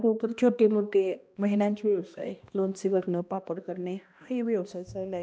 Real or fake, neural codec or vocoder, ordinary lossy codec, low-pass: fake; codec, 16 kHz, 1 kbps, X-Codec, HuBERT features, trained on balanced general audio; none; none